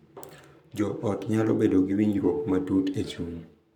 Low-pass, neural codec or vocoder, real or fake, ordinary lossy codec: 19.8 kHz; codec, 44.1 kHz, 7.8 kbps, Pupu-Codec; fake; none